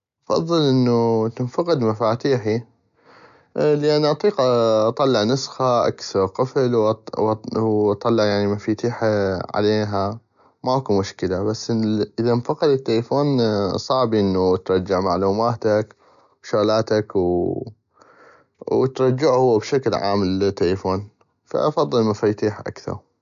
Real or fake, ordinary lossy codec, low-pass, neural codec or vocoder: real; none; 7.2 kHz; none